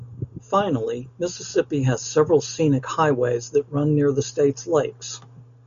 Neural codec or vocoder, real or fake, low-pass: none; real; 7.2 kHz